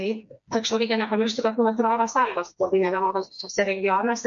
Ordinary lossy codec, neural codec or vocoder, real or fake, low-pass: MP3, 48 kbps; codec, 16 kHz, 2 kbps, FreqCodec, smaller model; fake; 7.2 kHz